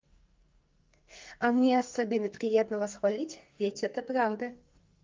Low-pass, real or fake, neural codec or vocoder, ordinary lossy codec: 7.2 kHz; fake; codec, 44.1 kHz, 2.6 kbps, SNAC; Opus, 24 kbps